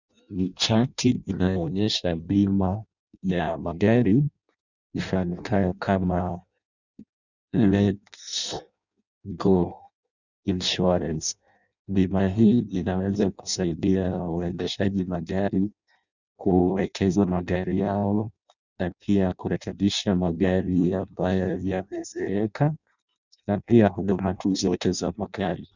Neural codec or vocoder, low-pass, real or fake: codec, 16 kHz in and 24 kHz out, 0.6 kbps, FireRedTTS-2 codec; 7.2 kHz; fake